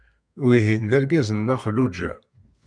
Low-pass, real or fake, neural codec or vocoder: 9.9 kHz; fake; codec, 32 kHz, 1.9 kbps, SNAC